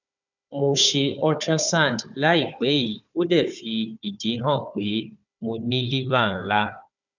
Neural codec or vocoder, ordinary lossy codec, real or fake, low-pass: codec, 16 kHz, 4 kbps, FunCodec, trained on Chinese and English, 50 frames a second; none; fake; 7.2 kHz